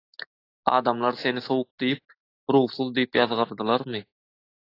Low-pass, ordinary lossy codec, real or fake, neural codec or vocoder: 5.4 kHz; AAC, 32 kbps; real; none